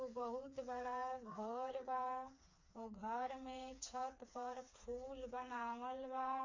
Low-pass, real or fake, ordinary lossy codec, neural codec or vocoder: 7.2 kHz; fake; MP3, 64 kbps; codec, 16 kHz, 4 kbps, FreqCodec, smaller model